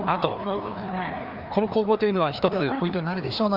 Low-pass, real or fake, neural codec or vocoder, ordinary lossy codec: 5.4 kHz; fake; codec, 16 kHz, 2 kbps, FreqCodec, larger model; Opus, 64 kbps